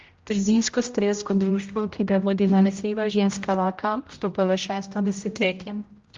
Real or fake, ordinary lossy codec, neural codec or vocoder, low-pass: fake; Opus, 32 kbps; codec, 16 kHz, 0.5 kbps, X-Codec, HuBERT features, trained on general audio; 7.2 kHz